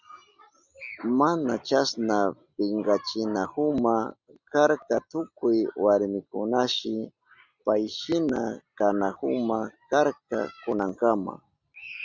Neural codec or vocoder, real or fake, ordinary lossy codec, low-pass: none; real; Opus, 64 kbps; 7.2 kHz